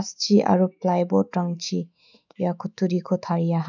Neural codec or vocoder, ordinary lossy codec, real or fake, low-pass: autoencoder, 48 kHz, 128 numbers a frame, DAC-VAE, trained on Japanese speech; none; fake; 7.2 kHz